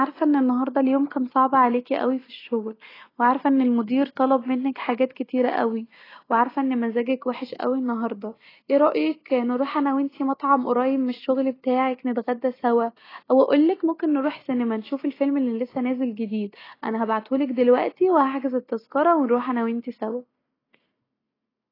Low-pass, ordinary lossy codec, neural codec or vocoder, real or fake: 5.4 kHz; AAC, 24 kbps; none; real